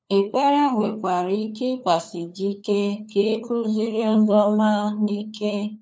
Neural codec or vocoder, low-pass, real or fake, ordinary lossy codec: codec, 16 kHz, 4 kbps, FunCodec, trained on LibriTTS, 50 frames a second; none; fake; none